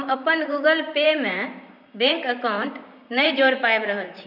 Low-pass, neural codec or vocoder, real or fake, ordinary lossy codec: 5.4 kHz; vocoder, 44.1 kHz, 128 mel bands, Pupu-Vocoder; fake; none